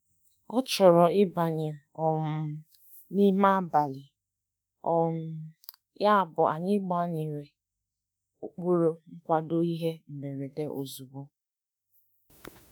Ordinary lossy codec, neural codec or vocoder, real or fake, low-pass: none; autoencoder, 48 kHz, 32 numbers a frame, DAC-VAE, trained on Japanese speech; fake; none